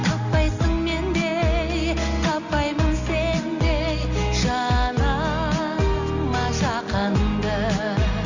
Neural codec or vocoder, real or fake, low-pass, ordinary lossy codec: none; real; 7.2 kHz; none